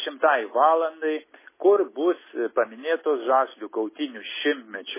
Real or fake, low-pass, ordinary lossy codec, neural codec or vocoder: real; 3.6 kHz; MP3, 16 kbps; none